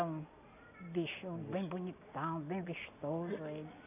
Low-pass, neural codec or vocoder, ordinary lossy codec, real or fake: 3.6 kHz; none; none; real